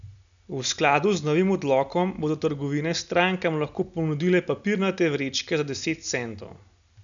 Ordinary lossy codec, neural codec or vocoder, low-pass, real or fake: none; none; 7.2 kHz; real